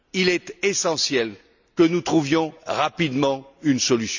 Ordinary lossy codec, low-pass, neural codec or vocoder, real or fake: none; 7.2 kHz; none; real